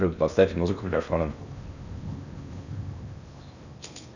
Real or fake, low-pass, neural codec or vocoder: fake; 7.2 kHz; codec, 16 kHz in and 24 kHz out, 0.8 kbps, FocalCodec, streaming, 65536 codes